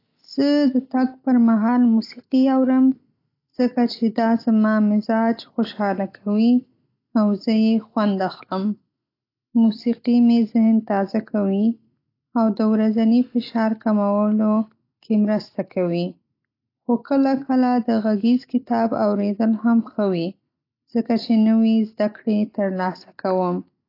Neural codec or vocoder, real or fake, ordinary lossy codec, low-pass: none; real; AAC, 32 kbps; 5.4 kHz